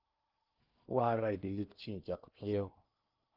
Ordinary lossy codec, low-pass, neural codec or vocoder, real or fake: Opus, 24 kbps; 5.4 kHz; codec, 16 kHz in and 24 kHz out, 0.8 kbps, FocalCodec, streaming, 65536 codes; fake